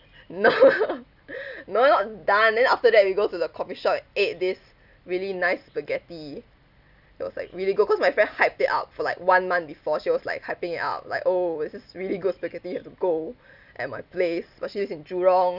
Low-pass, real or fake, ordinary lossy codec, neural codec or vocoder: 5.4 kHz; real; none; none